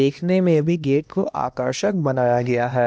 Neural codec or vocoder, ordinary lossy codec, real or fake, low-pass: codec, 16 kHz, 1 kbps, X-Codec, HuBERT features, trained on LibriSpeech; none; fake; none